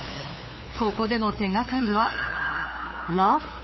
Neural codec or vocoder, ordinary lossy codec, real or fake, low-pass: codec, 16 kHz, 2 kbps, FunCodec, trained on LibriTTS, 25 frames a second; MP3, 24 kbps; fake; 7.2 kHz